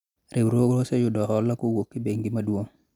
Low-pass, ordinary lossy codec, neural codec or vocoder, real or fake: 19.8 kHz; none; none; real